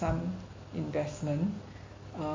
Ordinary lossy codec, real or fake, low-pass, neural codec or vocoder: MP3, 32 kbps; real; 7.2 kHz; none